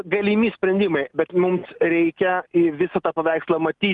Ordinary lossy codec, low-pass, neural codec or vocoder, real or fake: Opus, 32 kbps; 10.8 kHz; none; real